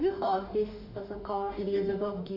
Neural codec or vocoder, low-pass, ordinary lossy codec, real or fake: autoencoder, 48 kHz, 32 numbers a frame, DAC-VAE, trained on Japanese speech; 5.4 kHz; none; fake